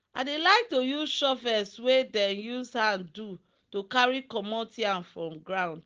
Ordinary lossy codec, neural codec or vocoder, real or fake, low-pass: Opus, 16 kbps; none; real; 7.2 kHz